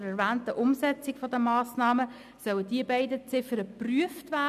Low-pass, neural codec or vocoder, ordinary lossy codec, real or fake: 14.4 kHz; none; none; real